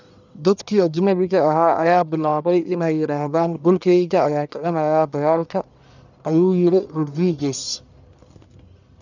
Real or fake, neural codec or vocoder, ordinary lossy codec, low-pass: fake; codec, 44.1 kHz, 1.7 kbps, Pupu-Codec; none; 7.2 kHz